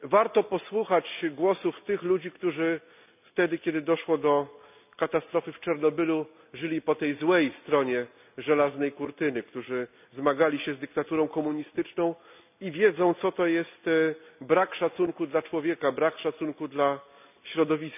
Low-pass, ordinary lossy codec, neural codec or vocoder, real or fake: 3.6 kHz; none; none; real